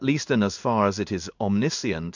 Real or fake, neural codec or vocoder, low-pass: fake; codec, 16 kHz in and 24 kHz out, 1 kbps, XY-Tokenizer; 7.2 kHz